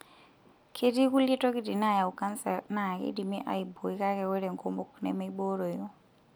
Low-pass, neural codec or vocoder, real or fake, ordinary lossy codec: none; none; real; none